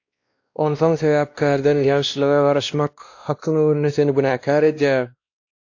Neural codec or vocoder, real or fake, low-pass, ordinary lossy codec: codec, 16 kHz, 1 kbps, X-Codec, WavLM features, trained on Multilingual LibriSpeech; fake; 7.2 kHz; AAC, 48 kbps